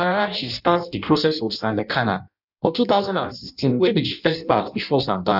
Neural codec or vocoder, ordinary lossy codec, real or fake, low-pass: codec, 16 kHz in and 24 kHz out, 0.6 kbps, FireRedTTS-2 codec; none; fake; 5.4 kHz